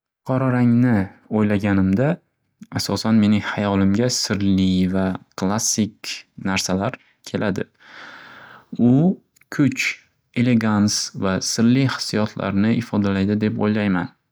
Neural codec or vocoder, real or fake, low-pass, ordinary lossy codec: none; real; none; none